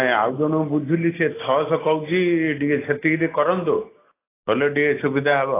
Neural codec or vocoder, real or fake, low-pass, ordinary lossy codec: none; real; 3.6 kHz; AAC, 16 kbps